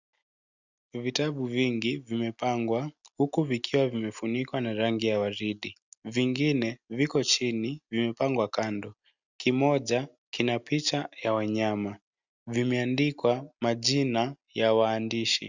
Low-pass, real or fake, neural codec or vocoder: 7.2 kHz; real; none